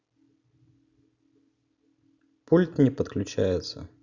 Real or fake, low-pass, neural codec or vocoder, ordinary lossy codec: real; 7.2 kHz; none; none